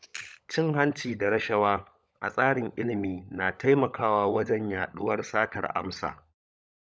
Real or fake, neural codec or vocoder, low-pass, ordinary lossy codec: fake; codec, 16 kHz, 16 kbps, FunCodec, trained on LibriTTS, 50 frames a second; none; none